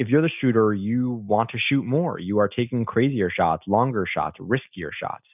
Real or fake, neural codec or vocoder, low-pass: real; none; 3.6 kHz